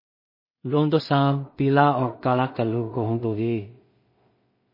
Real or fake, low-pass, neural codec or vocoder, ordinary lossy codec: fake; 5.4 kHz; codec, 16 kHz in and 24 kHz out, 0.4 kbps, LongCat-Audio-Codec, two codebook decoder; MP3, 24 kbps